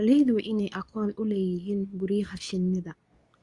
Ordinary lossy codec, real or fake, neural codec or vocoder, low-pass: Opus, 64 kbps; fake; codec, 24 kHz, 0.9 kbps, WavTokenizer, medium speech release version 2; 10.8 kHz